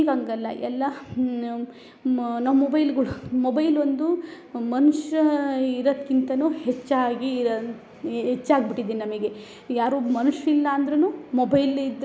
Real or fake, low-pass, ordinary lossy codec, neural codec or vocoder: real; none; none; none